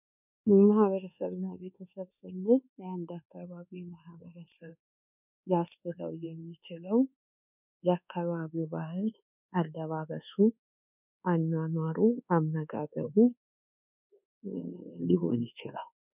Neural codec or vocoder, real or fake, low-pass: codec, 24 kHz, 1.2 kbps, DualCodec; fake; 3.6 kHz